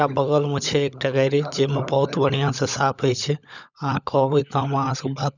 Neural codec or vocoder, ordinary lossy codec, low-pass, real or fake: codec, 16 kHz, 16 kbps, FunCodec, trained on LibriTTS, 50 frames a second; none; 7.2 kHz; fake